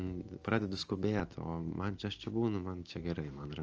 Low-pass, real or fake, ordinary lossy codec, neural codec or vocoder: 7.2 kHz; real; Opus, 24 kbps; none